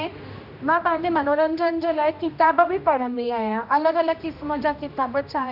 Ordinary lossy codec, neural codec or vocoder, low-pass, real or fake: none; codec, 16 kHz, 1 kbps, X-Codec, HuBERT features, trained on general audio; 5.4 kHz; fake